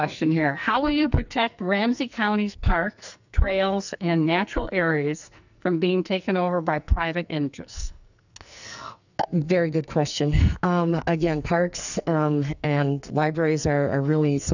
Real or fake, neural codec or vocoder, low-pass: fake; codec, 44.1 kHz, 2.6 kbps, SNAC; 7.2 kHz